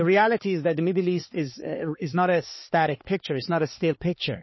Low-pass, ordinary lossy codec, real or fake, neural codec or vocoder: 7.2 kHz; MP3, 24 kbps; fake; codec, 16 kHz, 4 kbps, X-Codec, HuBERT features, trained on balanced general audio